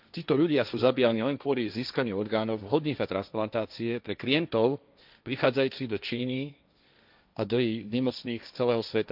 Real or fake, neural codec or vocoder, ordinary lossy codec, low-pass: fake; codec, 16 kHz, 1.1 kbps, Voila-Tokenizer; none; 5.4 kHz